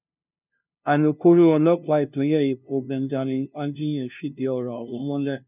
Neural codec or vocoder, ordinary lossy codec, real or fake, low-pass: codec, 16 kHz, 0.5 kbps, FunCodec, trained on LibriTTS, 25 frames a second; none; fake; 3.6 kHz